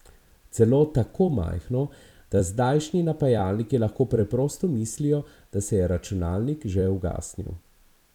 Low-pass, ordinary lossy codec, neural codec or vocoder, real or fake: 19.8 kHz; none; vocoder, 44.1 kHz, 128 mel bands every 256 samples, BigVGAN v2; fake